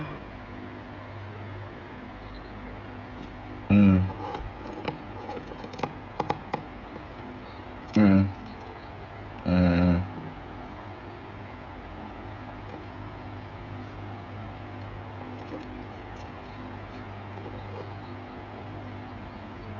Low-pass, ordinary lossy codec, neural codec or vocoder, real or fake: 7.2 kHz; none; codec, 16 kHz, 16 kbps, FreqCodec, smaller model; fake